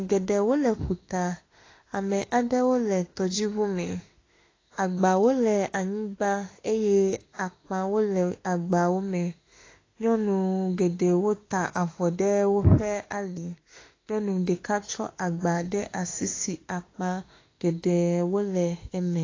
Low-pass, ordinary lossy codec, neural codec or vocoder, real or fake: 7.2 kHz; AAC, 32 kbps; autoencoder, 48 kHz, 32 numbers a frame, DAC-VAE, trained on Japanese speech; fake